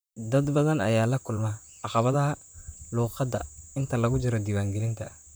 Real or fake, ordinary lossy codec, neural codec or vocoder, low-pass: fake; none; vocoder, 44.1 kHz, 128 mel bands, Pupu-Vocoder; none